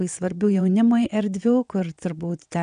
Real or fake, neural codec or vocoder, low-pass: fake; vocoder, 22.05 kHz, 80 mel bands, WaveNeXt; 9.9 kHz